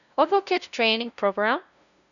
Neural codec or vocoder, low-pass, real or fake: codec, 16 kHz, 0.5 kbps, FunCodec, trained on LibriTTS, 25 frames a second; 7.2 kHz; fake